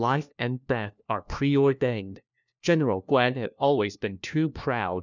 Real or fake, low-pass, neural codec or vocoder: fake; 7.2 kHz; codec, 16 kHz, 1 kbps, FunCodec, trained on LibriTTS, 50 frames a second